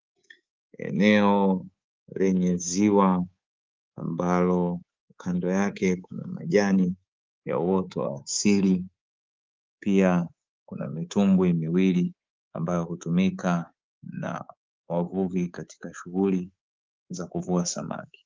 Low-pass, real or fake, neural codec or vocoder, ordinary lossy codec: 7.2 kHz; fake; codec, 24 kHz, 3.1 kbps, DualCodec; Opus, 32 kbps